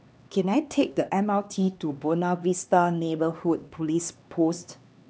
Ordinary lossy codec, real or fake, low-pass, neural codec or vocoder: none; fake; none; codec, 16 kHz, 2 kbps, X-Codec, HuBERT features, trained on LibriSpeech